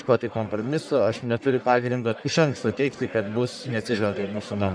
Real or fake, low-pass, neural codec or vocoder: fake; 9.9 kHz; codec, 44.1 kHz, 1.7 kbps, Pupu-Codec